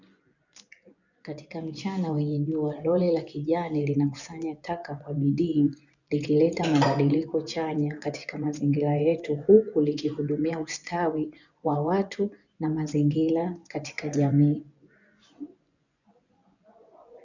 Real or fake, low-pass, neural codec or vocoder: fake; 7.2 kHz; vocoder, 24 kHz, 100 mel bands, Vocos